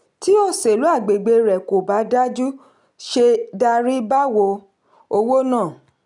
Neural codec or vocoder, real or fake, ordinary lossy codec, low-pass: none; real; none; 10.8 kHz